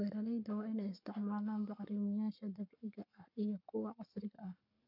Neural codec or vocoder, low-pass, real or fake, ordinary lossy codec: codec, 44.1 kHz, 7.8 kbps, Pupu-Codec; 5.4 kHz; fake; none